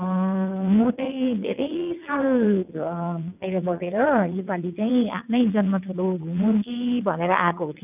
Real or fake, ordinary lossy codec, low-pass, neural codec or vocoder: fake; none; 3.6 kHz; vocoder, 22.05 kHz, 80 mel bands, WaveNeXt